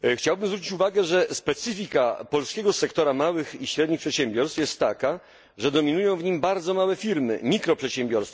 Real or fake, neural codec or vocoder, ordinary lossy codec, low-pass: real; none; none; none